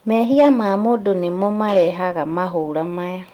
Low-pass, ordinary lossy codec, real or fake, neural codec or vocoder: 19.8 kHz; Opus, 24 kbps; fake; autoencoder, 48 kHz, 128 numbers a frame, DAC-VAE, trained on Japanese speech